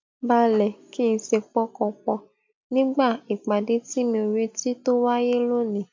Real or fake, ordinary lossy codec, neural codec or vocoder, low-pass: real; MP3, 64 kbps; none; 7.2 kHz